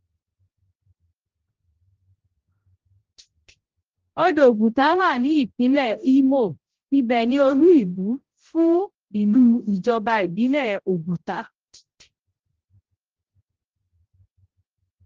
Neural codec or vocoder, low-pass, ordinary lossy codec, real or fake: codec, 16 kHz, 0.5 kbps, X-Codec, HuBERT features, trained on general audio; 7.2 kHz; Opus, 16 kbps; fake